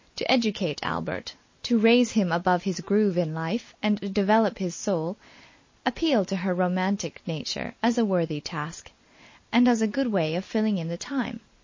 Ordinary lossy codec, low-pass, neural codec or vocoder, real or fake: MP3, 32 kbps; 7.2 kHz; none; real